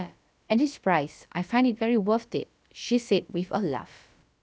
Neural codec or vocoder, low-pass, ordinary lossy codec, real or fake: codec, 16 kHz, about 1 kbps, DyCAST, with the encoder's durations; none; none; fake